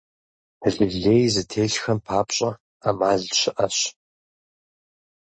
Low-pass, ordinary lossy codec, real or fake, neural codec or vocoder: 9.9 kHz; MP3, 32 kbps; real; none